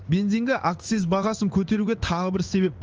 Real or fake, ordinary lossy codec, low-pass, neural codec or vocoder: fake; Opus, 24 kbps; 7.2 kHz; codec, 16 kHz in and 24 kHz out, 1 kbps, XY-Tokenizer